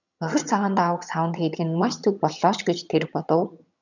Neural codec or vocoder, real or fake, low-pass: vocoder, 22.05 kHz, 80 mel bands, HiFi-GAN; fake; 7.2 kHz